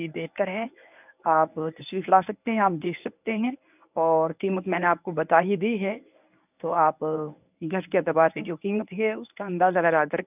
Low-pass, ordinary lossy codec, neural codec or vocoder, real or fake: 3.6 kHz; none; codec, 24 kHz, 0.9 kbps, WavTokenizer, medium speech release version 1; fake